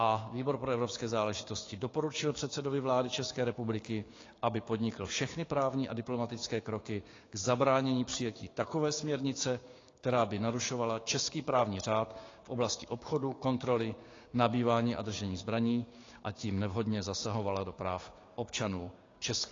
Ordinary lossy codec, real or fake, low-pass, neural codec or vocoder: AAC, 32 kbps; fake; 7.2 kHz; codec, 16 kHz, 6 kbps, DAC